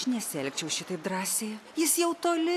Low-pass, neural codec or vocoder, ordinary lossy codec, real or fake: 14.4 kHz; none; AAC, 64 kbps; real